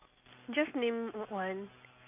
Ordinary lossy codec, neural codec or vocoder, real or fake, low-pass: AAC, 24 kbps; none; real; 3.6 kHz